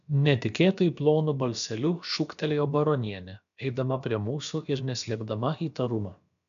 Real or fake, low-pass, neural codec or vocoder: fake; 7.2 kHz; codec, 16 kHz, about 1 kbps, DyCAST, with the encoder's durations